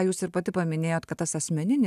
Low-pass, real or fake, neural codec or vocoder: 14.4 kHz; real; none